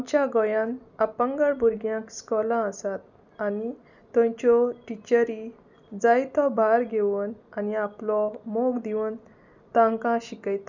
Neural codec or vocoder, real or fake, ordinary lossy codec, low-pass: none; real; none; 7.2 kHz